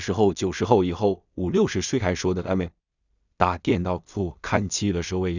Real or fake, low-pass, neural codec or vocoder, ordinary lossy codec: fake; 7.2 kHz; codec, 16 kHz in and 24 kHz out, 0.4 kbps, LongCat-Audio-Codec, two codebook decoder; none